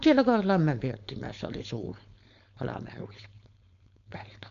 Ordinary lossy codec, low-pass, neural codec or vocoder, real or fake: AAC, 96 kbps; 7.2 kHz; codec, 16 kHz, 4.8 kbps, FACodec; fake